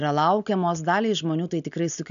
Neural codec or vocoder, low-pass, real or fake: none; 7.2 kHz; real